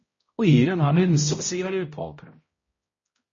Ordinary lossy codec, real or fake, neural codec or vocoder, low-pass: MP3, 32 kbps; fake; codec, 16 kHz, 0.5 kbps, X-Codec, HuBERT features, trained on balanced general audio; 7.2 kHz